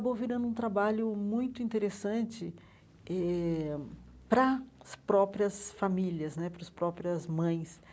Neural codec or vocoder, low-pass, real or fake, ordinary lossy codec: none; none; real; none